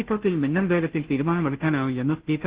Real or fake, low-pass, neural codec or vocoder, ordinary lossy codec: fake; 3.6 kHz; codec, 16 kHz, 0.5 kbps, FunCodec, trained on Chinese and English, 25 frames a second; Opus, 16 kbps